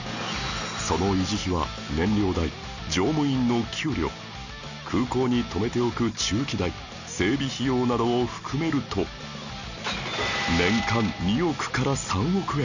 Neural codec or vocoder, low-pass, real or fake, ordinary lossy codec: none; 7.2 kHz; real; none